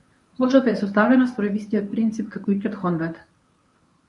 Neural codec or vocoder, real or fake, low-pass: codec, 24 kHz, 0.9 kbps, WavTokenizer, medium speech release version 1; fake; 10.8 kHz